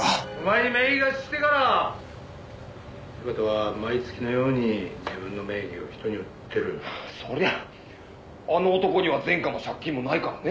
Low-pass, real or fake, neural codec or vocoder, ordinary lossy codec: none; real; none; none